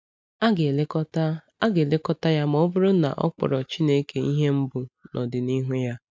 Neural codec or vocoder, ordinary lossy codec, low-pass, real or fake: none; none; none; real